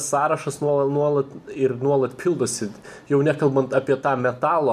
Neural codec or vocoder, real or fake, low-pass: none; real; 14.4 kHz